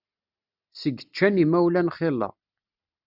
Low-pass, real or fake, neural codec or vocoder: 5.4 kHz; real; none